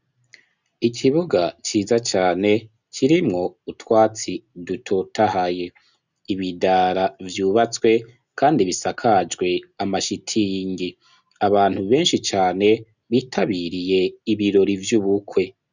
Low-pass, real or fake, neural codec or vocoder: 7.2 kHz; real; none